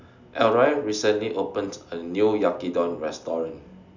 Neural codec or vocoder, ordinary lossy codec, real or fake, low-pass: none; none; real; 7.2 kHz